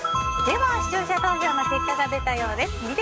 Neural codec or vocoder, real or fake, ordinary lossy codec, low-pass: codec, 16 kHz, 6 kbps, DAC; fake; none; none